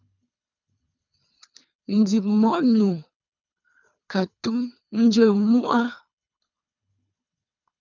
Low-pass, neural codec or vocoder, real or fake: 7.2 kHz; codec, 24 kHz, 3 kbps, HILCodec; fake